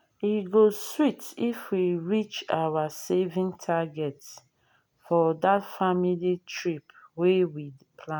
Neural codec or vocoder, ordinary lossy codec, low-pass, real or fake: none; none; none; real